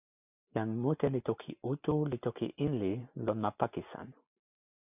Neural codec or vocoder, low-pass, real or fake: none; 3.6 kHz; real